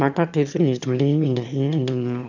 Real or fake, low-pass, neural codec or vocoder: fake; 7.2 kHz; autoencoder, 22.05 kHz, a latent of 192 numbers a frame, VITS, trained on one speaker